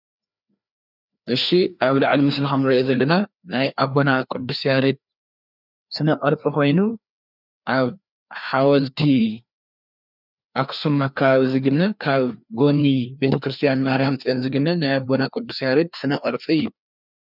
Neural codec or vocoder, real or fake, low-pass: codec, 16 kHz, 2 kbps, FreqCodec, larger model; fake; 5.4 kHz